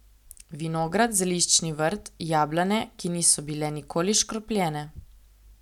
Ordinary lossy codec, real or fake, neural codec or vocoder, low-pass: none; real; none; 19.8 kHz